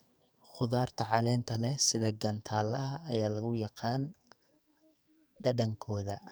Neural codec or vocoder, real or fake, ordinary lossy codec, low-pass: codec, 44.1 kHz, 2.6 kbps, SNAC; fake; none; none